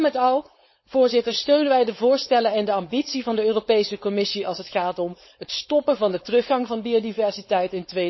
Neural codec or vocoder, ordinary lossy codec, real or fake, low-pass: codec, 16 kHz, 4.8 kbps, FACodec; MP3, 24 kbps; fake; 7.2 kHz